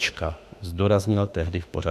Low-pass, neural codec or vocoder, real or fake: 14.4 kHz; autoencoder, 48 kHz, 32 numbers a frame, DAC-VAE, trained on Japanese speech; fake